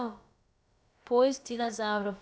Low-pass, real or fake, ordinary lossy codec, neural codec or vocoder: none; fake; none; codec, 16 kHz, about 1 kbps, DyCAST, with the encoder's durations